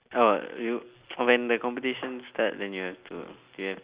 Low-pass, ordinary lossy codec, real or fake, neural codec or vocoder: 3.6 kHz; Opus, 64 kbps; real; none